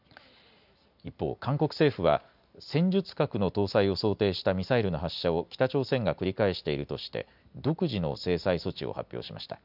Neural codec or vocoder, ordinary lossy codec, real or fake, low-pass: none; none; real; 5.4 kHz